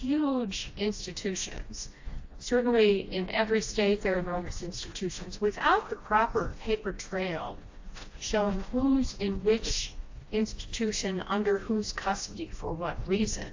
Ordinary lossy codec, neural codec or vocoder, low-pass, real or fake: AAC, 48 kbps; codec, 16 kHz, 1 kbps, FreqCodec, smaller model; 7.2 kHz; fake